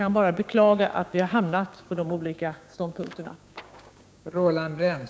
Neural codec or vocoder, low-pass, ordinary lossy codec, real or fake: codec, 16 kHz, 6 kbps, DAC; none; none; fake